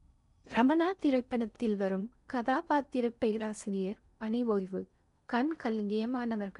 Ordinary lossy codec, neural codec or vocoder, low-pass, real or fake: none; codec, 16 kHz in and 24 kHz out, 0.6 kbps, FocalCodec, streaming, 4096 codes; 10.8 kHz; fake